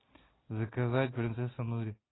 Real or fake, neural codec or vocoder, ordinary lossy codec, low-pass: real; none; AAC, 16 kbps; 7.2 kHz